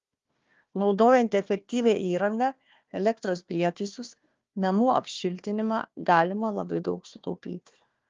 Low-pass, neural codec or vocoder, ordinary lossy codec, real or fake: 7.2 kHz; codec, 16 kHz, 1 kbps, FunCodec, trained on Chinese and English, 50 frames a second; Opus, 32 kbps; fake